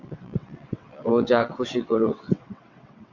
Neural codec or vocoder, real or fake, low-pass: vocoder, 22.05 kHz, 80 mel bands, WaveNeXt; fake; 7.2 kHz